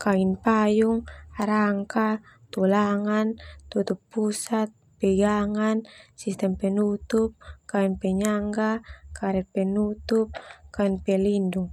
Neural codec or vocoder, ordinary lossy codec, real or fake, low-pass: none; none; real; 19.8 kHz